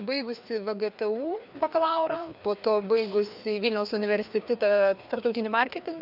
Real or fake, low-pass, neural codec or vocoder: fake; 5.4 kHz; codec, 16 kHz, 2 kbps, FreqCodec, larger model